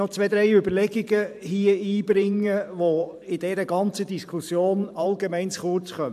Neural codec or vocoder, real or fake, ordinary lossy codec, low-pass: vocoder, 44.1 kHz, 128 mel bands every 512 samples, BigVGAN v2; fake; MP3, 96 kbps; 14.4 kHz